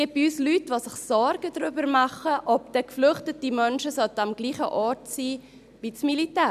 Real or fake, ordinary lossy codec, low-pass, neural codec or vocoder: real; none; 14.4 kHz; none